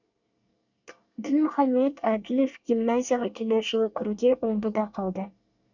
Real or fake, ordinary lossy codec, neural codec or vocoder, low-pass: fake; none; codec, 24 kHz, 1 kbps, SNAC; 7.2 kHz